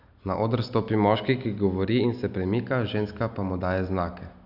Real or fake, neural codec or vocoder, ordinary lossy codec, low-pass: real; none; none; 5.4 kHz